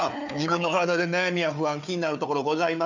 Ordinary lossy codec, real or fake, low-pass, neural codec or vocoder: none; fake; 7.2 kHz; codec, 16 kHz, 4 kbps, FunCodec, trained on Chinese and English, 50 frames a second